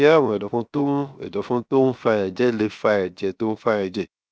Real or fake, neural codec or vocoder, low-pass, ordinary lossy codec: fake; codec, 16 kHz, 0.7 kbps, FocalCodec; none; none